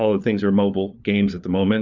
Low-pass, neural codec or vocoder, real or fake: 7.2 kHz; codec, 16 kHz, 4 kbps, FunCodec, trained on LibriTTS, 50 frames a second; fake